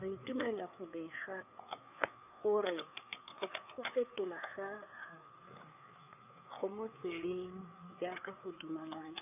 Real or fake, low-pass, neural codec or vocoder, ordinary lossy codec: fake; 3.6 kHz; codec, 16 kHz, 4 kbps, FreqCodec, larger model; none